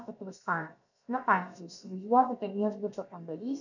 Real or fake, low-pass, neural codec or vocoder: fake; 7.2 kHz; codec, 16 kHz, about 1 kbps, DyCAST, with the encoder's durations